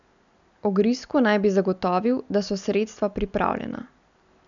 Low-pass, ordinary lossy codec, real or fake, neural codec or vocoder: 7.2 kHz; none; real; none